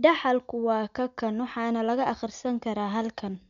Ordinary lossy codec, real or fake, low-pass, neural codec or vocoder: MP3, 96 kbps; real; 7.2 kHz; none